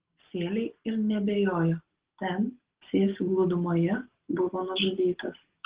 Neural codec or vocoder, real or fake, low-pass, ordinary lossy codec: none; real; 3.6 kHz; Opus, 16 kbps